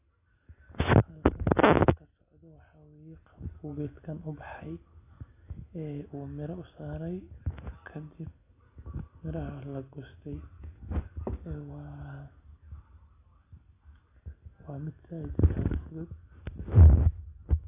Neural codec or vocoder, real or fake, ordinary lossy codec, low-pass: none; real; AAC, 24 kbps; 3.6 kHz